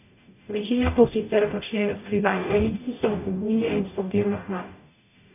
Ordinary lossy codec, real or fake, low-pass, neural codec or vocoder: none; fake; 3.6 kHz; codec, 44.1 kHz, 0.9 kbps, DAC